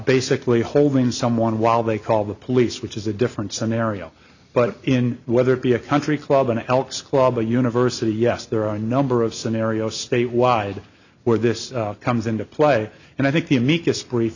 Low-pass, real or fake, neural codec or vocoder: 7.2 kHz; real; none